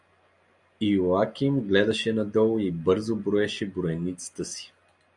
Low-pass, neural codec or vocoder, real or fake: 10.8 kHz; none; real